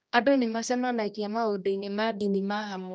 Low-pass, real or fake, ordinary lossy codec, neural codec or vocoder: none; fake; none; codec, 16 kHz, 1 kbps, X-Codec, HuBERT features, trained on general audio